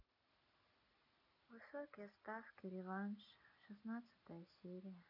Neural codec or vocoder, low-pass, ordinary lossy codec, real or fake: none; 5.4 kHz; none; real